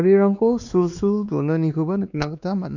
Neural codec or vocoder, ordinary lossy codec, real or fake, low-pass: codec, 16 kHz, 2 kbps, X-Codec, WavLM features, trained on Multilingual LibriSpeech; none; fake; 7.2 kHz